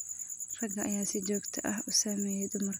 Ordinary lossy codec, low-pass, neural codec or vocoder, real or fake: none; none; none; real